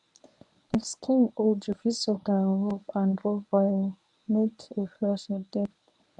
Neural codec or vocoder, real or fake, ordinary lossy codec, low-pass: codec, 24 kHz, 0.9 kbps, WavTokenizer, medium speech release version 1; fake; none; 10.8 kHz